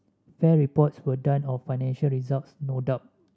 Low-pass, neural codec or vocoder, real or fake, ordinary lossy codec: none; none; real; none